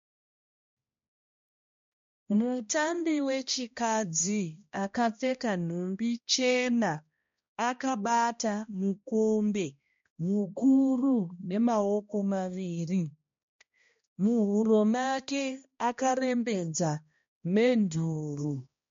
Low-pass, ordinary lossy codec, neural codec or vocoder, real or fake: 7.2 kHz; MP3, 48 kbps; codec, 16 kHz, 1 kbps, X-Codec, HuBERT features, trained on balanced general audio; fake